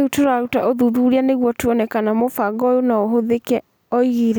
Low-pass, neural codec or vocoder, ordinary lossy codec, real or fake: none; none; none; real